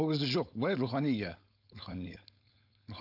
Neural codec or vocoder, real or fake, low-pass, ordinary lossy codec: codec, 16 kHz, 4.8 kbps, FACodec; fake; 5.4 kHz; none